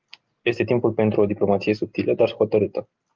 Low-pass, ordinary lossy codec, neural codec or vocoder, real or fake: 7.2 kHz; Opus, 24 kbps; none; real